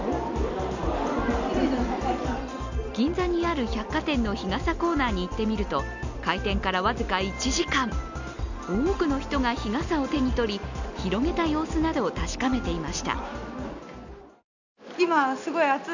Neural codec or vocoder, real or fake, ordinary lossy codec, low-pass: none; real; none; 7.2 kHz